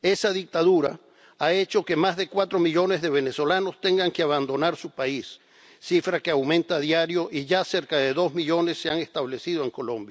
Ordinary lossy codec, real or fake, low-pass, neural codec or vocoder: none; real; none; none